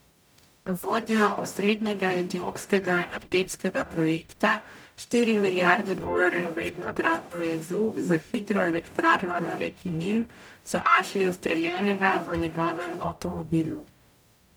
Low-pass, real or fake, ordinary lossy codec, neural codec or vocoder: none; fake; none; codec, 44.1 kHz, 0.9 kbps, DAC